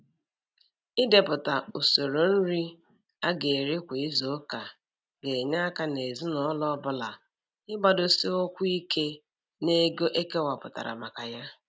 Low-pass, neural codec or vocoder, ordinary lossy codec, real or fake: none; none; none; real